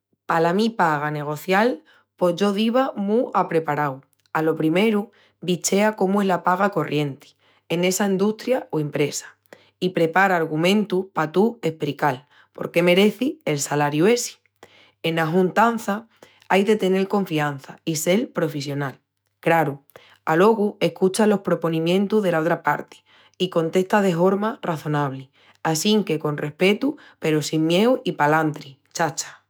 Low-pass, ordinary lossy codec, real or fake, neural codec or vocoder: none; none; fake; autoencoder, 48 kHz, 128 numbers a frame, DAC-VAE, trained on Japanese speech